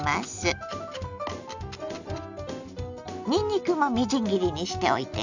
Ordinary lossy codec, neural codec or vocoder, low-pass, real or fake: none; none; 7.2 kHz; real